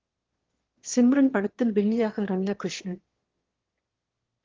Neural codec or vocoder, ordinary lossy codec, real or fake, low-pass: autoencoder, 22.05 kHz, a latent of 192 numbers a frame, VITS, trained on one speaker; Opus, 16 kbps; fake; 7.2 kHz